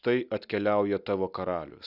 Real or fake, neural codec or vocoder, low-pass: real; none; 5.4 kHz